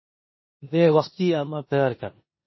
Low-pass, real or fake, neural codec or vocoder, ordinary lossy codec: 7.2 kHz; fake; codec, 16 kHz in and 24 kHz out, 0.9 kbps, LongCat-Audio-Codec, four codebook decoder; MP3, 24 kbps